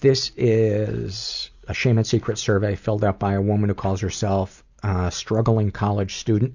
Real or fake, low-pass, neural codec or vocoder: real; 7.2 kHz; none